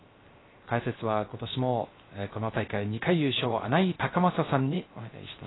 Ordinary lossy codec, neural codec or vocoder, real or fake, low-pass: AAC, 16 kbps; codec, 16 kHz, 0.3 kbps, FocalCodec; fake; 7.2 kHz